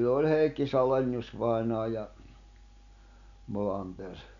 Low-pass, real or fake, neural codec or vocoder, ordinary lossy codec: 7.2 kHz; real; none; none